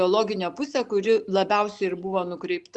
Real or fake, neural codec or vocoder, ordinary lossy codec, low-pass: real; none; Opus, 64 kbps; 10.8 kHz